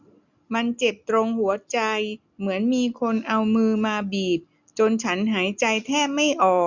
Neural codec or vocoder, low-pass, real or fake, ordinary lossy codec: none; 7.2 kHz; real; none